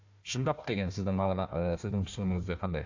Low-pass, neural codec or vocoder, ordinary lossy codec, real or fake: 7.2 kHz; codec, 16 kHz, 1 kbps, FunCodec, trained on Chinese and English, 50 frames a second; AAC, 48 kbps; fake